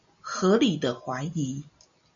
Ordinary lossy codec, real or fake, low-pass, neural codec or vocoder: MP3, 64 kbps; real; 7.2 kHz; none